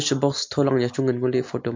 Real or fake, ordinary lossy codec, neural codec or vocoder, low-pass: real; MP3, 48 kbps; none; 7.2 kHz